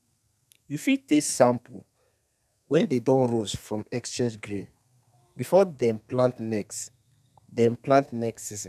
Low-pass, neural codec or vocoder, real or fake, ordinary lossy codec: 14.4 kHz; codec, 32 kHz, 1.9 kbps, SNAC; fake; none